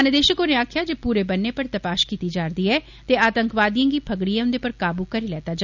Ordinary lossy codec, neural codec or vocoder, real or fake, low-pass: none; none; real; 7.2 kHz